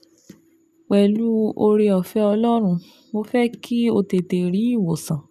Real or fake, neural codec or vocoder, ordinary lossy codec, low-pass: real; none; none; 14.4 kHz